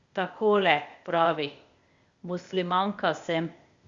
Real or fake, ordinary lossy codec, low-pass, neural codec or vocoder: fake; Opus, 64 kbps; 7.2 kHz; codec, 16 kHz, 0.8 kbps, ZipCodec